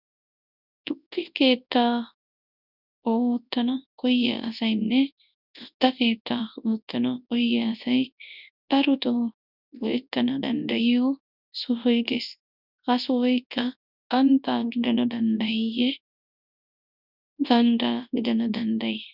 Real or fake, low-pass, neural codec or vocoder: fake; 5.4 kHz; codec, 24 kHz, 0.9 kbps, WavTokenizer, large speech release